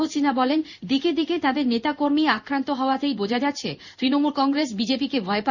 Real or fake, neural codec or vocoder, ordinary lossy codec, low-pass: fake; codec, 16 kHz in and 24 kHz out, 1 kbps, XY-Tokenizer; none; 7.2 kHz